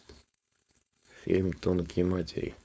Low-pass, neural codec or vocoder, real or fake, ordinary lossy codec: none; codec, 16 kHz, 4.8 kbps, FACodec; fake; none